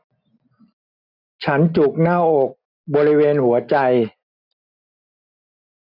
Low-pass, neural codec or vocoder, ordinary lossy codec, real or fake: 5.4 kHz; none; none; real